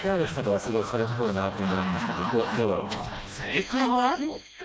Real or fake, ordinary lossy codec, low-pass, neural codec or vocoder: fake; none; none; codec, 16 kHz, 1 kbps, FreqCodec, smaller model